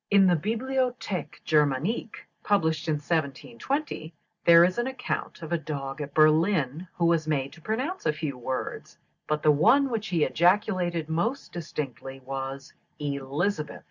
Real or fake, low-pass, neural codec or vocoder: real; 7.2 kHz; none